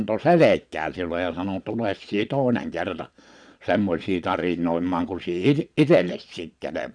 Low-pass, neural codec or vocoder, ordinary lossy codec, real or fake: 9.9 kHz; vocoder, 22.05 kHz, 80 mel bands, Vocos; none; fake